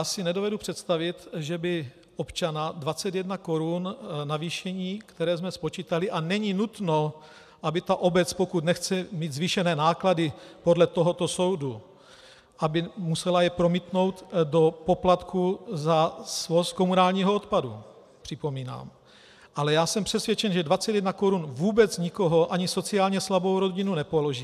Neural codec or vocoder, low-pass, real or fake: none; 14.4 kHz; real